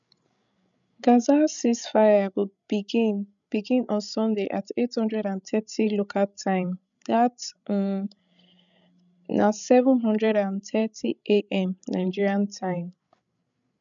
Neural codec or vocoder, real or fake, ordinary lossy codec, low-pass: codec, 16 kHz, 16 kbps, FreqCodec, larger model; fake; none; 7.2 kHz